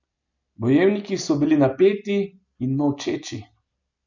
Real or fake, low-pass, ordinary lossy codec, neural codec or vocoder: real; 7.2 kHz; none; none